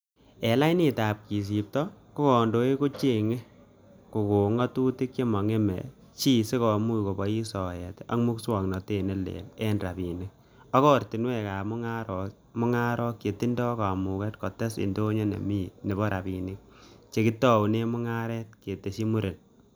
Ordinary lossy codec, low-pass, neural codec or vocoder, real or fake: none; none; none; real